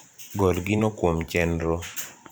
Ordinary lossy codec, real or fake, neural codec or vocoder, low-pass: none; fake; vocoder, 44.1 kHz, 128 mel bands every 512 samples, BigVGAN v2; none